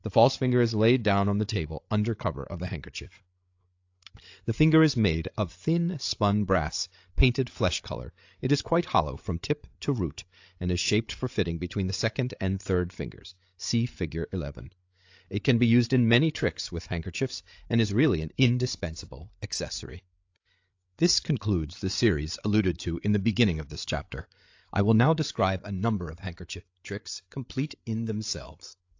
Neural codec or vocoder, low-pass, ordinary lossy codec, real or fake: codec, 16 kHz, 8 kbps, FreqCodec, larger model; 7.2 kHz; AAC, 48 kbps; fake